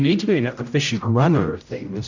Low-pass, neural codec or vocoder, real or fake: 7.2 kHz; codec, 16 kHz, 0.5 kbps, X-Codec, HuBERT features, trained on general audio; fake